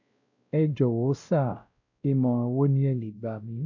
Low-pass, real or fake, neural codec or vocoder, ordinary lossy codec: 7.2 kHz; fake; codec, 16 kHz, 0.5 kbps, X-Codec, WavLM features, trained on Multilingual LibriSpeech; none